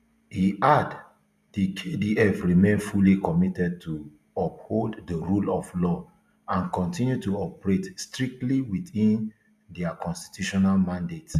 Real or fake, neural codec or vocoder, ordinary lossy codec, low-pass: real; none; none; 14.4 kHz